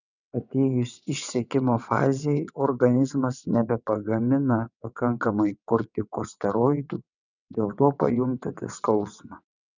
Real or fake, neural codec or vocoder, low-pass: fake; vocoder, 22.05 kHz, 80 mel bands, WaveNeXt; 7.2 kHz